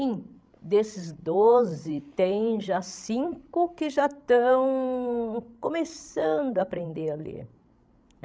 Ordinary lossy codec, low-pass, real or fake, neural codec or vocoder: none; none; fake; codec, 16 kHz, 16 kbps, FreqCodec, larger model